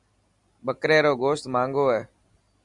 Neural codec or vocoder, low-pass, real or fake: none; 10.8 kHz; real